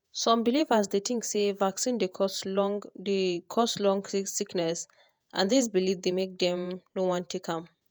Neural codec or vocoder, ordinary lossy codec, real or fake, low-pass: vocoder, 48 kHz, 128 mel bands, Vocos; none; fake; none